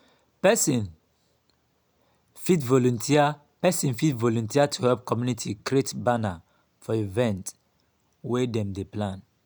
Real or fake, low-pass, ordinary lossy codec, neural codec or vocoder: real; none; none; none